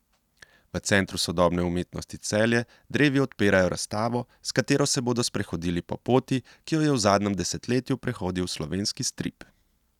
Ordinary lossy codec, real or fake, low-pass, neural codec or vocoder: none; real; 19.8 kHz; none